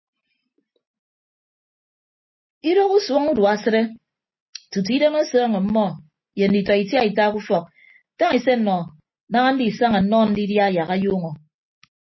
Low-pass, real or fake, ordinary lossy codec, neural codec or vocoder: 7.2 kHz; real; MP3, 24 kbps; none